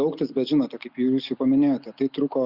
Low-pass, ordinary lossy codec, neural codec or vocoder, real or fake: 5.4 kHz; Opus, 64 kbps; none; real